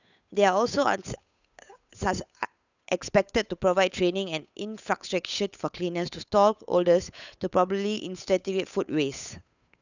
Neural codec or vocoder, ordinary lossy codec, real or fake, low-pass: codec, 16 kHz, 8 kbps, FunCodec, trained on Chinese and English, 25 frames a second; none; fake; 7.2 kHz